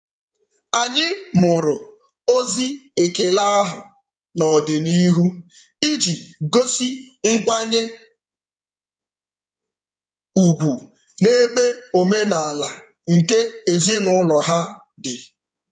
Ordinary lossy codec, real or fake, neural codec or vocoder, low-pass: none; fake; codec, 16 kHz in and 24 kHz out, 2.2 kbps, FireRedTTS-2 codec; 9.9 kHz